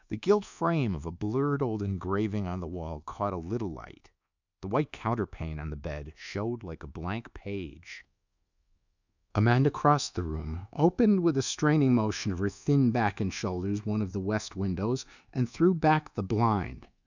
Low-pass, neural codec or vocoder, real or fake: 7.2 kHz; codec, 24 kHz, 1.2 kbps, DualCodec; fake